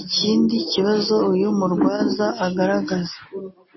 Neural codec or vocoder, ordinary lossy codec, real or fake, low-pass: none; MP3, 24 kbps; real; 7.2 kHz